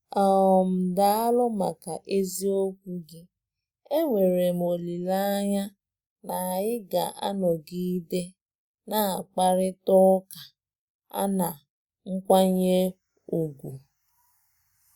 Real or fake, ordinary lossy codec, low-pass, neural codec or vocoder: real; Opus, 64 kbps; 19.8 kHz; none